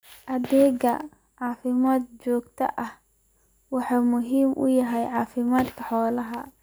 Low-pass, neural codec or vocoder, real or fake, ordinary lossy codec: none; none; real; none